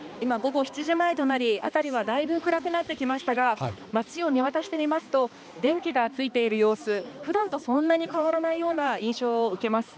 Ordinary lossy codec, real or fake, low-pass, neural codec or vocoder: none; fake; none; codec, 16 kHz, 2 kbps, X-Codec, HuBERT features, trained on balanced general audio